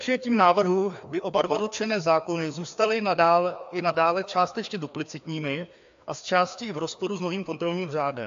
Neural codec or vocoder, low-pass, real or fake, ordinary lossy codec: codec, 16 kHz, 2 kbps, FreqCodec, larger model; 7.2 kHz; fake; AAC, 64 kbps